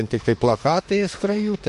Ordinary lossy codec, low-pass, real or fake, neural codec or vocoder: MP3, 48 kbps; 14.4 kHz; fake; autoencoder, 48 kHz, 32 numbers a frame, DAC-VAE, trained on Japanese speech